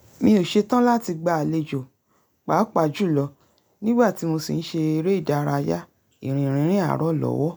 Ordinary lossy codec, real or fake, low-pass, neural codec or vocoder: none; real; none; none